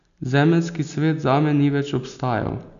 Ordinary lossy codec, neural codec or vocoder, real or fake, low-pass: none; none; real; 7.2 kHz